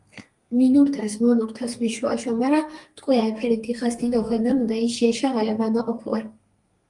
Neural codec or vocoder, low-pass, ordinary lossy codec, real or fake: codec, 32 kHz, 1.9 kbps, SNAC; 10.8 kHz; Opus, 32 kbps; fake